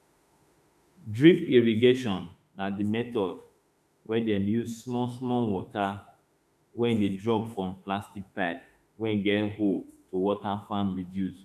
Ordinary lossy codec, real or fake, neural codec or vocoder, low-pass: none; fake; autoencoder, 48 kHz, 32 numbers a frame, DAC-VAE, trained on Japanese speech; 14.4 kHz